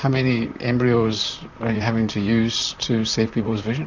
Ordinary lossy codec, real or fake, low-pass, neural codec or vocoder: Opus, 64 kbps; fake; 7.2 kHz; vocoder, 44.1 kHz, 128 mel bands, Pupu-Vocoder